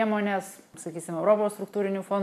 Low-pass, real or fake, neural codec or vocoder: 14.4 kHz; real; none